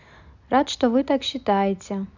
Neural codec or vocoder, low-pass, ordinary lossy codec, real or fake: none; 7.2 kHz; none; real